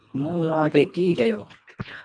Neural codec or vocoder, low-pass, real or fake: codec, 24 kHz, 1.5 kbps, HILCodec; 9.9 kHz; fake